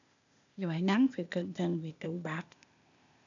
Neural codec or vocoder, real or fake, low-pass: codec, 16 kHz, 0.8 kbps, ZipCodec; fake; 7.2 kHz